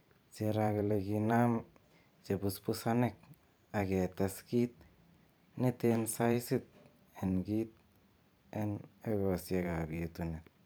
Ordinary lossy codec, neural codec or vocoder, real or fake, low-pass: none; vocoder, 44.1 kHz, 128 mel bands every 512 samples, BigVGAN v2; fake; none